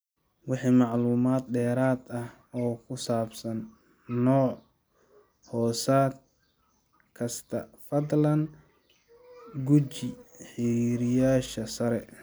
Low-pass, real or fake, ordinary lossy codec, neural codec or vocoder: none; real; none; none